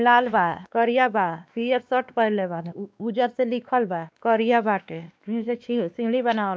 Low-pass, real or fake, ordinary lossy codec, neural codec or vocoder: none; fake; none; codec, 16 kHz, 2 kbps, X-Codec, WavLM features, trained on Multilingual LibriSpeech